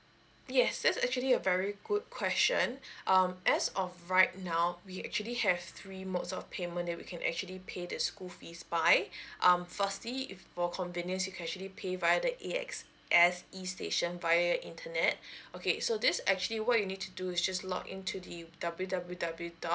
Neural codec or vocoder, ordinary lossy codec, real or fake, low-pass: none; none; real; none